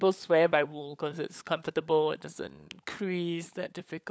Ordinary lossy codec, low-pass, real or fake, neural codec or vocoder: none; none; fake; codec, 16 kHz, 16 kbps, FunCodec, trained on LibriTTS, 50 frames a second